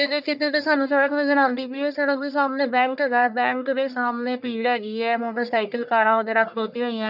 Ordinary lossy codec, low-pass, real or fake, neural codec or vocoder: none; 5.4 kHz; fake; codec, 44.1 kHz, 1.7 kbps, Pupu-Codec